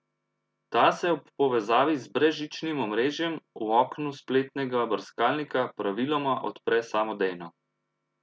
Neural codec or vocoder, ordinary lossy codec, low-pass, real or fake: none; none; none; real